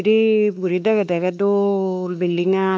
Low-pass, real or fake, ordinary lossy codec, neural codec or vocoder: none; fake; none; codec, 16 kHz, 2 kbps, X-Codec, WavLM features, trained on Multilingual LibriSpeech